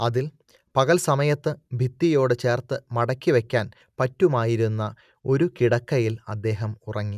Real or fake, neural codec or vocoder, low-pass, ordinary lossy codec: real; none; 14.4 kHz; none